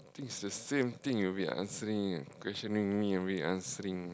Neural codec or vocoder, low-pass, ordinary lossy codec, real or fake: none; none; none; real